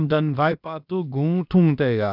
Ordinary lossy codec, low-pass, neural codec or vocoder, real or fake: none; 5.4 kHz; codec, 16 kHz, about 1 kbps, DyCAST, with the encoder's durations; fake